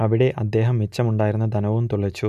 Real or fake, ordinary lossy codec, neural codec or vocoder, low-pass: real; none; none; 14.4 kHz